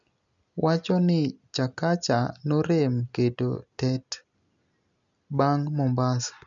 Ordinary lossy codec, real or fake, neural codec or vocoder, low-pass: none; real; none; 7.2 kHz